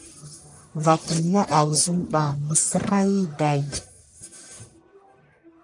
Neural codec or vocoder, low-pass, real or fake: codec, 44.1 kHz, 1.7 kbps, Pupu-Codec; 10.8 kHz; fake